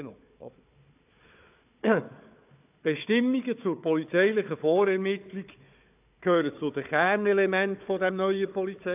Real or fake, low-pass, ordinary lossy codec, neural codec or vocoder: fake; 3.6 kHz; AAC, 32 kbps; codec, 16 kHz, 4 kbps, FunCodec, trained on Chinese and English, 50 frames a second